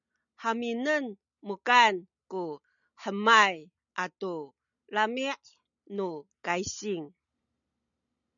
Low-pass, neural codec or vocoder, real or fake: 7.2 kHz; none; real